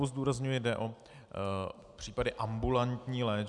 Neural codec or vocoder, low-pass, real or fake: none; 10.8 kHz; real